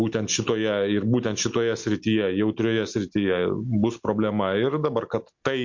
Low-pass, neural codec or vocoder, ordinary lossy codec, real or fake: 7.2 kHz; none; MP3, 48 kbps; real